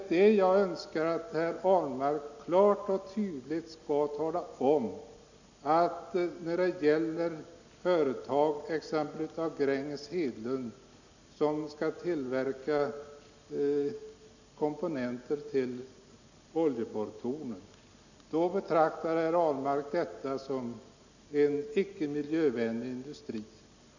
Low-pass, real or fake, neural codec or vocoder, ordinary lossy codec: 7.2 kHz; real; none; none